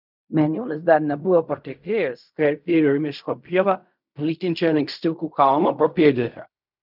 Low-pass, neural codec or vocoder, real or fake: 5.4 kHz; codec, 16 kHz in and 24 kHz out, 0.4 kbps, LongCat-Audio-Codec, fine tuned four codebook decoder; fake